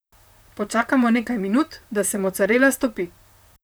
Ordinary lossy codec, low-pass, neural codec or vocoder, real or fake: none; none; vocoder, 44.1 kHz, 128 mel bands, Pupu-Vocoder; fake